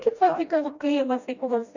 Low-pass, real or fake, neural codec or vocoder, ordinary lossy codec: 7.2 kHz; fake; codec, 16 kHz, 1 kbps, FreqCodec, smaller model; Opus, 64 kbps